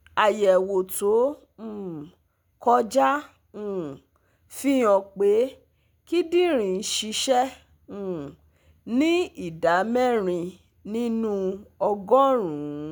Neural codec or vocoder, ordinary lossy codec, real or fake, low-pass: none; none; real; none